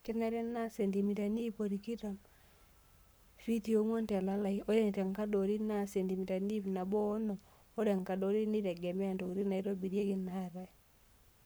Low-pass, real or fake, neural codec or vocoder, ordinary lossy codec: none; fake; codec, 44.1 kHz, 7.8 kbps, Pupu-Codec; none